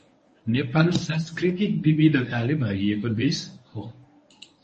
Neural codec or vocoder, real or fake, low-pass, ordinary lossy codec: codec, 24 kHz, 0.9 kbps, WavTokenizer, medium speech release version 1; fake; 10.8 kHz; MP3, 32 kbps